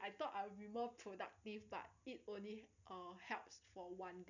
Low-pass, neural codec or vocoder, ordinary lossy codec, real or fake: 7.2 kHz; none; none; real